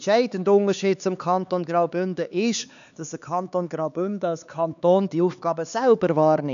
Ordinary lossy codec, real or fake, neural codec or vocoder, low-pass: none; fake; codec, 16 kHz, 4 kbps, X-Codec, HuBERT features, trained on LibriSpeech; 7.2 kHz